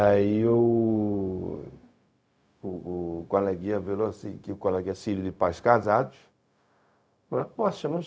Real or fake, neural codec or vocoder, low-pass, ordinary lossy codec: fake; codec, 16 kHz, 0.4 kbps, LongCat-Audio-Codec; none; none